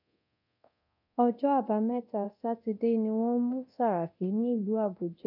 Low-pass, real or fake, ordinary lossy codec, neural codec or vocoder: 5.4 kHz; fake; none; codec, 24 kHz, 0.9 kbps, DualCodec